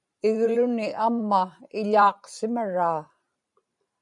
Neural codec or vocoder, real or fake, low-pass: vocoder, 44.1 kHz, 128 mel bands every 512 samples, BigVGAN v2; fake; 10.8 kHz